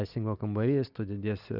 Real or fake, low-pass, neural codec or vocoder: real; 5.4 kHz; none